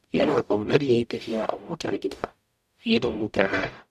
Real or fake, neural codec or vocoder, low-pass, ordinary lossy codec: fake; codec, 44.1 kHz, 0.9 kbps, DAC; 14.4 kHz; none